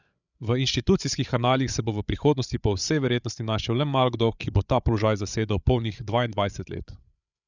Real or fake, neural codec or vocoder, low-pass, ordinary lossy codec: fake; codec, 16 kHz, 8 kbps, FreqCodec, larger model; 7.2 kHz; none